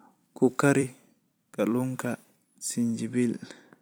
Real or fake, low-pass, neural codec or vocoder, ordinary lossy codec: fake; none; vocoder, 44.1 kHz, 128 mel bands every 256 samples, BigVGAN v2; none